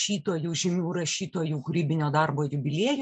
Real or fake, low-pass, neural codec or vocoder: real; 9.9 kHz; none